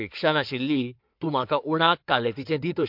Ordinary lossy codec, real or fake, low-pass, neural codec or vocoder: MP3, 48 kbps; fake; 5.4 kHz; codec, 16 kHz in and 24 kHz out, 2.2 kbps, FireRedTTS-2 codec